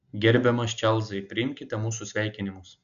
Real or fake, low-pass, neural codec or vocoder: real; 7.2 kHz; none